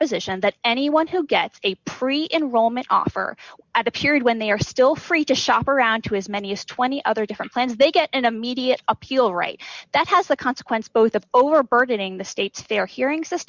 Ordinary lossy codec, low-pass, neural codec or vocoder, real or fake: Opus, 64 kbps; 7.2 kHz; none; real